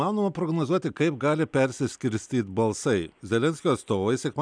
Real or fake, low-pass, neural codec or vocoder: real; 9.9 kHz; none